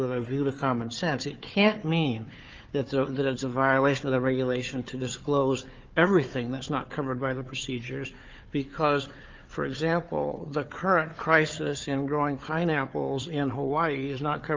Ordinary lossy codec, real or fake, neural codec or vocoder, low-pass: Opus, 24 kbps; fake; codec, 16 kHz, 4 kbps, FunCodec, trained on Chinese and English, 50 frames a second; 7.2 kHz